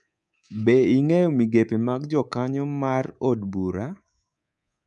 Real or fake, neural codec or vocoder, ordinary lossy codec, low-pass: fake; codec, 24 kHz, 3.1 kbps, DualCodec; none; 10.8 kHz